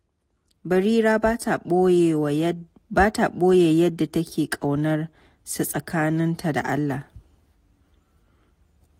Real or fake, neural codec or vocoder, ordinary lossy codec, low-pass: real; none; AAC, 48 kbps; 19.8 kHz